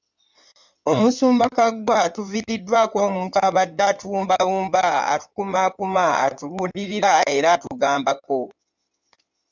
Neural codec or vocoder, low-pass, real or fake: codec, 16 kHz in and 24 kHz out, 2.2 kbps, FireRedTTS-2 codec; 7.2 kHz; fake